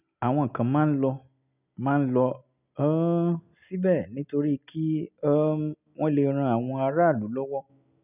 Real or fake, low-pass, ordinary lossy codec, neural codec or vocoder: real; 3.6 kHz; none; none